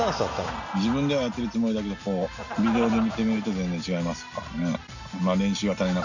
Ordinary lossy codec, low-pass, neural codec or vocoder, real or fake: none; 7.2 kHz; none; real